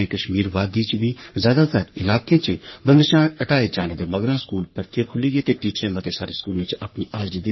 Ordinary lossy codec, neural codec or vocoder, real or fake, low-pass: MP3, 24 kbps; codec, 44.1 kHz, 3.4 kbps, Pupu-Codec; fake; 7.2 kHz